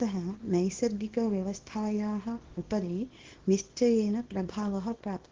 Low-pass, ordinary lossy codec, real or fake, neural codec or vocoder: 7.2 kHz; Opus, 16 kbps; fake; codec, 24 kHz, 0.9 kbps, WavTokenizer, small release